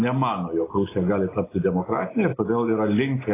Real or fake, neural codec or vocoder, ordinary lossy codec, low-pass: real; none; AAC, 16 kbps; 3.6 kHz